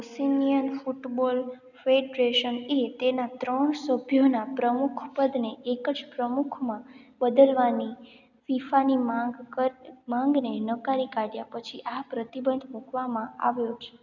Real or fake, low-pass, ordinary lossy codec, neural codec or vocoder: real; 7.2 kHz; none; none